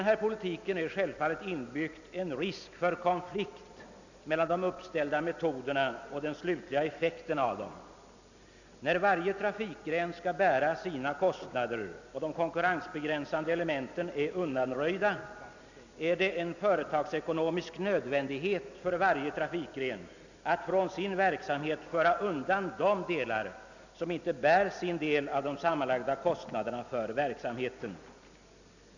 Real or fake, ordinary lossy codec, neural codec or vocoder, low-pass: real; none; none; 7.2 kHz